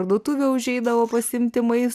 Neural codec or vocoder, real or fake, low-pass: none; real; 14.4 kHz